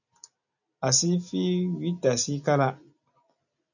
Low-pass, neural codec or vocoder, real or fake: 7.2 kHz; none; real